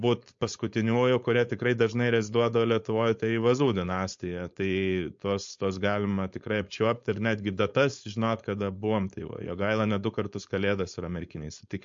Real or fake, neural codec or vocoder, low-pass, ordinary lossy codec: fake; codec, 16 kHz, 4.8 kbps, FACodec; 7.2 kHz; MP3, 48 kbps